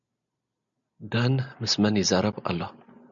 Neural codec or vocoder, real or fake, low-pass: none; real; 7.2 kHz